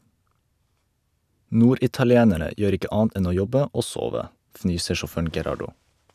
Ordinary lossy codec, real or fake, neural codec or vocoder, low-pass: none; real; none; 14.4 kHz